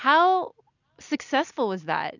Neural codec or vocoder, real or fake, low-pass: none; real; 7.2 kHz